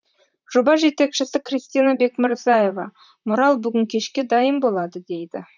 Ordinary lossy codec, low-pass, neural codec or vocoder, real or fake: none; 7.2 kHz; vocoder, 44.1 kHz, 128 mel bands, Pupu-Vocoder; fake